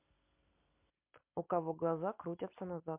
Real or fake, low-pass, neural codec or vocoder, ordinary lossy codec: real; 3.6 kHz; none; MP3, 32 kbps